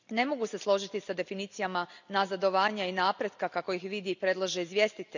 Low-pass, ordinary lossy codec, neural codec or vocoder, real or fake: 7.2 kHz; none; none; real